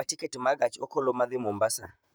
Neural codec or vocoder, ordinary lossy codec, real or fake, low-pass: vocoder, 44.1 kHz, 128 mel bands, Pupu-Vocoder; none; fake; none